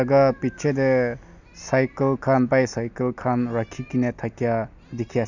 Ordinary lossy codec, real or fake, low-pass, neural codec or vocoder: none; real; 7.2 kHz; none